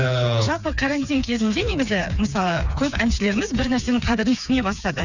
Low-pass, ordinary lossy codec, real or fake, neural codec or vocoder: 7.2 kHz; none; fake; codec, 16 kHz, 4 kbps, FreqCodec, smaller model